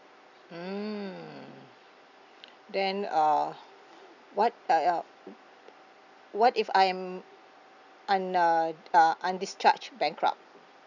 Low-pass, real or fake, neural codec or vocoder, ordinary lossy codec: 7.2 kHz; real; none; none